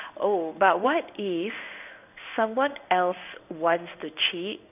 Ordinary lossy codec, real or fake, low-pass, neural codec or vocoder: none; fake; 3.6 kHz; codec, 16 kHz in and 24 kHz out, 1 kbps, XY-Tokenizer